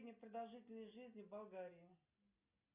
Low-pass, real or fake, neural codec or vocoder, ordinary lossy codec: 3.6 kHz; real; none; Opus, 64 kbps